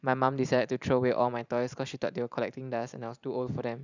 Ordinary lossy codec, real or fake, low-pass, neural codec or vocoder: none; fake; 7.2 kHz; vocoder, 44.1 kHz, 128 mel bands every 512 samples, BigVGAN v2